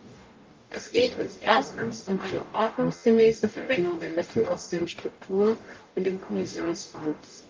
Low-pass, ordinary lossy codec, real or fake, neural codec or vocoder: 7.2 kHz; Opus, 24 kbps; fake; codec, 44.1 kHz, 0.9 kbps, DAC